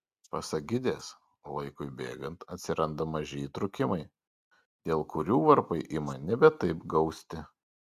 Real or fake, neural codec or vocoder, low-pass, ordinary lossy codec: real; none; 14.4 kHz; Opus, 64 kbps